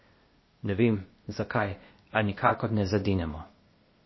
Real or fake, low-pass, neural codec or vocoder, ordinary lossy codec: fake; 7.2 kHz; codec, 16 kHz, 0.8 kbps, ZipCodec; MP3, 24 kbps